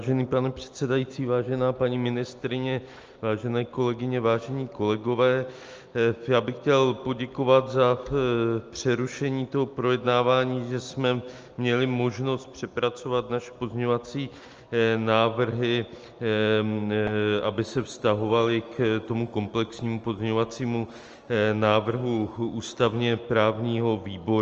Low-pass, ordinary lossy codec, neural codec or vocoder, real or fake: 7.2 kHz; Opus, 24 kbps; none; real